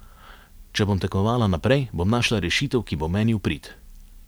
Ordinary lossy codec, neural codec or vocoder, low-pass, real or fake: none; none; none; real